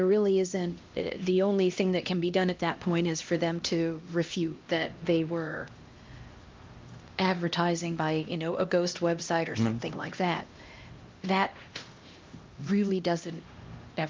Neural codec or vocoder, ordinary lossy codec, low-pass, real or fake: codec, 16 kHz, 1 kbps, X-Codec, HuBERT features, trained on LibriSpeech; Opus, 24 kbps; 7.2 kHz; fake